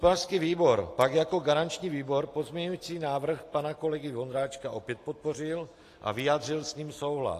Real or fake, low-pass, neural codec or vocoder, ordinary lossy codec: real; 14.4 kHz; none; AAC, 48 kbps